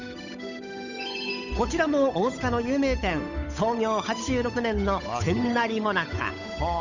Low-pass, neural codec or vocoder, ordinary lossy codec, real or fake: 7.2 kHz; codec, 16 kHz, 8 kbps, FunCodec, trained on Chinese and English, 25 frames a second; none; fake